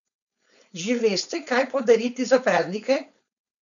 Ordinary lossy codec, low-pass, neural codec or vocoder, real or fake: none; 7.2 kHz; codec, 16 kHz, 4.8 kbps, FACodec; fake